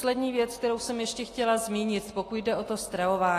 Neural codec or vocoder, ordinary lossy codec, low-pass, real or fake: none; AAC, 48 kbps; 14.4 kHz; real